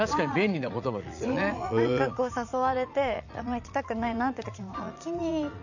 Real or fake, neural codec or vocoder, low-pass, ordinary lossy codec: fake; vocoder, 44.1 kHz, 80 mel bands, Vocos; 7.2 kHz; none